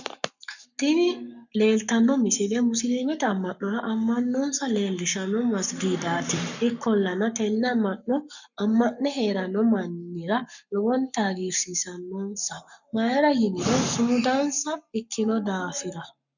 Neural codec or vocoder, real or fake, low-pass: codec, 44.1 kHz, 7.8 kbps, Pupu-Codec; fake; 7.2 kHz